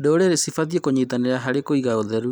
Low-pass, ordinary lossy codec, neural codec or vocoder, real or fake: none; none; none; real